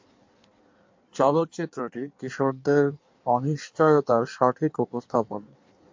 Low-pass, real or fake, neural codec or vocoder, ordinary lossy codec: 7.2 kHz; fake; codec, 16 kHz in and 24 kHz out, 1.1 kbps, FireRedTTS-2 codec; MP3, 48 kbps